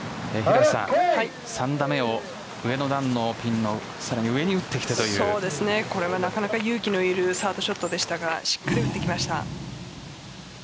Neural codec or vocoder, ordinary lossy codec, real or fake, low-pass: none; none; real; none